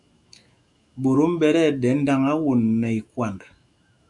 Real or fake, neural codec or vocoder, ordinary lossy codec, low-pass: fake; autoencoder, 48 kHz, 128 numbers a frame, DAC-VAE, trained on Japanese speech; MP3, 96 kbps; 10.8 kHz